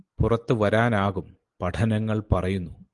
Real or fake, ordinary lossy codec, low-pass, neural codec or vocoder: real; Opus, 24 kbps; 10.8 kHz; none